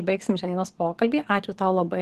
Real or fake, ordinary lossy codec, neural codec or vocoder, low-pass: fake; Opus, 16 kbps; codec, 44.1 kHz, 7.8 kbps, DAC; 14.4 kHz